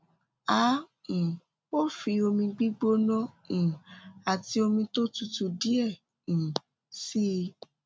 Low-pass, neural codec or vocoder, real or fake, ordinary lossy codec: none; none; real; none